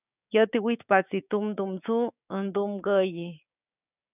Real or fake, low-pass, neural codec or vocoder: fake; 3.6 kHz; codec, 16 kHz, 6 kbps, DAC